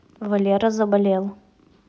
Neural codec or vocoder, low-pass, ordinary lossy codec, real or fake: none; none; none; real